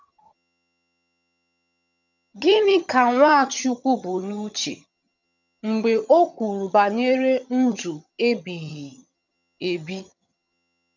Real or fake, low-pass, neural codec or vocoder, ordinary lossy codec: fake; 7.2 kHz; vocoder, 22.05 kHz, 80 mel bands, HiFi-GAN; none